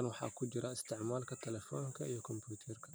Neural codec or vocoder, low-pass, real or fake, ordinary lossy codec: none; none; real; none